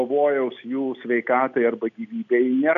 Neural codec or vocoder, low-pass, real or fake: none; 7.2 kHz; real